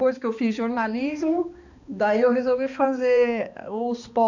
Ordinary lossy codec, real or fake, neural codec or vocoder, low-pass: none; fake; codec, 16 kHz, 2 kbps, X-Codec, HuBERT features, trained on balanced general audio; 7.2 kHz